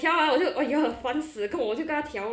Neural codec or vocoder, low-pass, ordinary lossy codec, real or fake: none; none; none; real